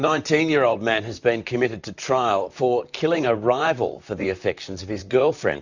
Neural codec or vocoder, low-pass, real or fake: vocoder, 44.1 kHz, 128 mel bands, Pupu-Vocoder; 7.2 kHz; fake